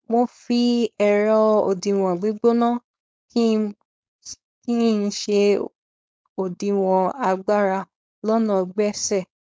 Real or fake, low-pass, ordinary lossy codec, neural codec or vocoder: fake; none; none; codec, 16 kHz, 4.8 kbps, FACodec